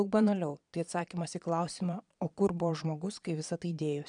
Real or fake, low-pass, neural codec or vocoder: fake; 9.9 kHz; vocoder, 22.05 kHz, 80 mel bands, Vocos